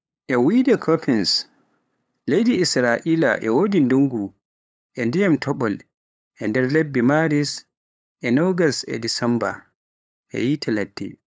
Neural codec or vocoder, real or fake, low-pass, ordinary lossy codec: codec, 16 kHz, 8 kbps, FunCodec, trained on LibriTTS, 25 frames a second; fake; none; none